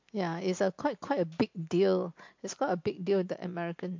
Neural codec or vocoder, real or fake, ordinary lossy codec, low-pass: none; real; MP3, 48 kbps; 7.2 kHz